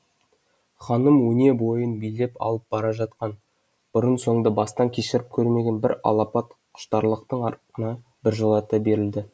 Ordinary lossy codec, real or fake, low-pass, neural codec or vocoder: none; real; none; none